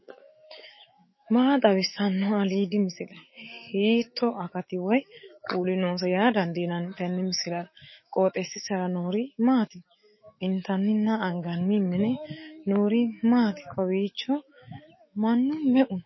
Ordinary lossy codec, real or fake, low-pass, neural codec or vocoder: MP3, 24 kbps; fake; 7.2 kHz; autoencoder, 48 kHz, 128 numbers a frame, DAC-VAE, trained on Japanese speech